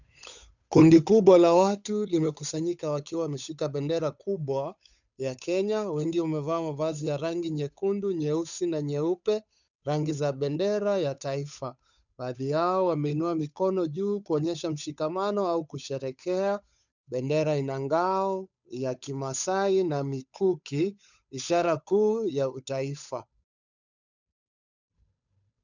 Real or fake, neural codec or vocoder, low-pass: fake; codec, 16 kHz, 8 kbps, FunCodec, trained on Chinese and English, 25 frames a second; 7.2 kHz